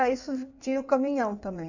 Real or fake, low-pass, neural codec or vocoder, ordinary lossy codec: fake; 7.2 kHz; codec, 16 kHz in and 24 kHz out, 1.1 kbps, FireRedTTS-2 codec; none